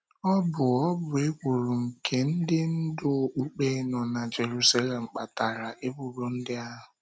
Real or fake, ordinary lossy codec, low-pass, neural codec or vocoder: real; none; none; none